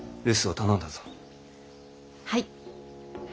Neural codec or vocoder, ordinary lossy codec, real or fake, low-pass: none; none; real; none